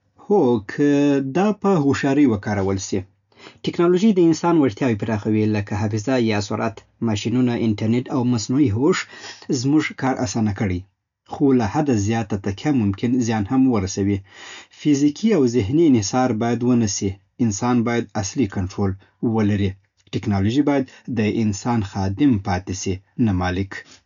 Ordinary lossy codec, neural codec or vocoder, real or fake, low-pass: none; none; real; 7.2 kHz